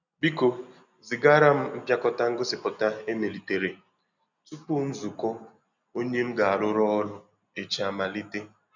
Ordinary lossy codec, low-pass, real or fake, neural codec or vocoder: none; 7.2 kHz; real; none